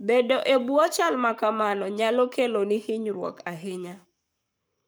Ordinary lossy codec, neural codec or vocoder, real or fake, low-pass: none; codec, 44.1 kHz, 7.8 kbps, Pupu-Codec; fake; none